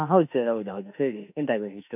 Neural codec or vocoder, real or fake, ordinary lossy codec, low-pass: autoencoder, 48 kHz, 32 numbers a frame, DAC-VAE, trained on Japanese speech; fake; none; 3.6 kHz